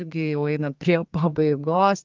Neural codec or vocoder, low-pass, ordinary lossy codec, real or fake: codec, 32 kHz, 1.9 kbps, SNAC; 7.2 kHz; Opus, 24 kbps; fake